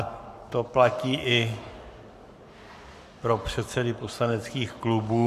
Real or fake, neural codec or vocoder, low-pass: fake; codec, 44.1 kHz, 7.8 kbps, Pupu-Codec; 14.4 kHz